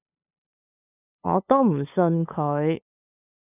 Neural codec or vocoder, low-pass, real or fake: codec, 16 kHz, 8 kbps, FunCodec, trained on LibriTTS, 25 frames a second; 3.6 kHz; fake